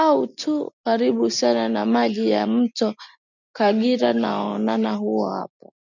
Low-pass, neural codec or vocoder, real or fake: 7.2 kHz; none; real